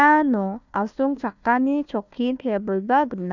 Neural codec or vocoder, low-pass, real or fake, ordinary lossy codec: codec, 16 kHz, 1 kbps, FunCodec, trained on Chinese and English, 50 frames a second; 7.2 kHz; fake; none